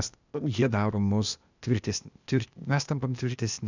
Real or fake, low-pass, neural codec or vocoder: fake; 7.2 kHz; codec, 16 kHz, 0.8 kbps, ZipCodec